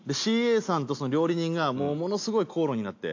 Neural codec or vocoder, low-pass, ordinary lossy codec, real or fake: none; 7.2 kHz; AAC, 48 kbps; real